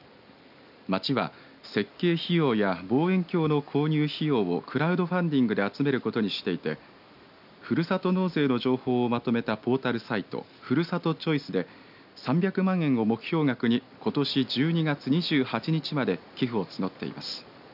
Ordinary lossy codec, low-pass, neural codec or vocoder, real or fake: none; 5.4 kHz; none; real